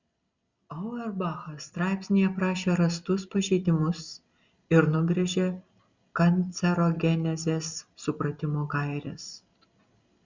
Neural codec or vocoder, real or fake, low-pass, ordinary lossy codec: none; real; 7.2 kHz; Opus, 64 kbps